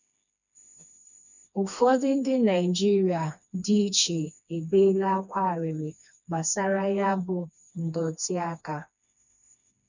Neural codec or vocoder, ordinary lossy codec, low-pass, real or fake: codec, 16 kHz, 2 kbps, FreqCodec, smaller model; none; 7.2 kHz; fake